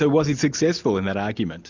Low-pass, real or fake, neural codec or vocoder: 7.2 kHz; real; none